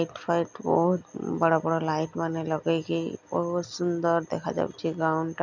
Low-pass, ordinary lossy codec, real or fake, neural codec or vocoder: 7.2 kHz; none; real; none